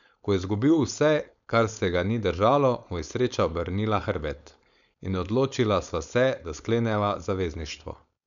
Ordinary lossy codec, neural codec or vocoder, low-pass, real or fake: none; codec, 16 kHz, 4.8 kbps, FACodec; 7.2 kHz; fake